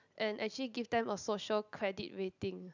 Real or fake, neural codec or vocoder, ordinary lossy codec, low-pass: real; none; none; 7.2 kHz